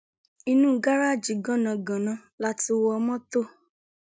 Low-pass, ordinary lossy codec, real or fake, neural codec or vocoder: none; none; real; none